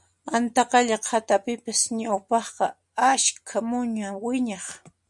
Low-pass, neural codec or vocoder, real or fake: 10.8 kHz; none; real